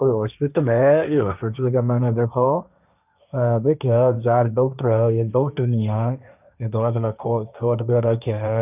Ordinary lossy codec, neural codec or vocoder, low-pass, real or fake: none; codec, 16 kHz, 1.1 kbps, Voila-Tokenizer; 3.6 kHz; fake